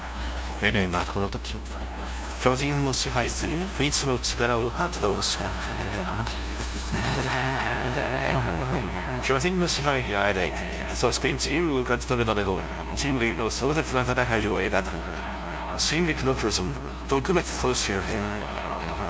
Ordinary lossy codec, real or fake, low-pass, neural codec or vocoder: none; fake; none; codec, 16 kHz, 0.5 kbps, FunCodec, trained on LibriTTS, 25 frames a second